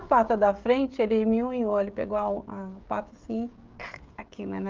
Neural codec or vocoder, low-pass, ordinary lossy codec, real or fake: codec, 16 kHz, 16 kbps, FreqCodec, smaller model; 7.2 kHz; Opus, 32 kbps; fake